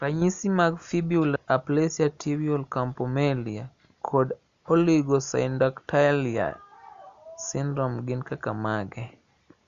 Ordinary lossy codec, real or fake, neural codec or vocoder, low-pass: Opus, 64 kbps; real; none; 7.2 kHz